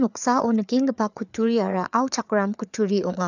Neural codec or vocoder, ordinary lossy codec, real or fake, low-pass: codec, 16 kHz, 4 kbps, FreqCodec, larger model; none; fake; 7.2 kHz